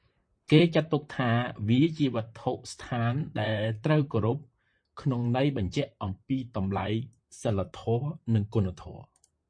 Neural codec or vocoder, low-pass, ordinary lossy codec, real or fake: vocoder, 44.1 kHz, 128 mel bands, Pupu-Vocoder; 9.9 kHz; MP3, 48 kbps; fake